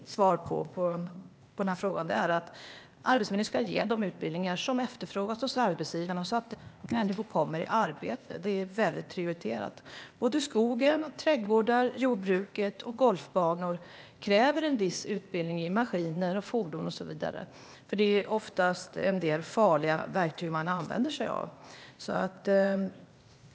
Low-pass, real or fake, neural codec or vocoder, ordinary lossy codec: none; fake; codec, 16 kHz, 0.8 kbps, ZipCodec; none